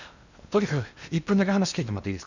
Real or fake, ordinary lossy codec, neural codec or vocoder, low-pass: fake; none; codec, 16 kHz in and 24 kHz out, 0.8 kbps, FocalCodec, streaming, 65536 codes; 7.2 kHz